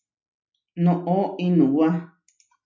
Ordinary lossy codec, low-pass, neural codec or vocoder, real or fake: MP3, 64 kbps; 7.2 kHz; none; real